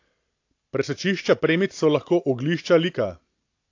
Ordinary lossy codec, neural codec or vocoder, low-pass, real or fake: AAC, 48 kbps; none; 7.2 kHz; real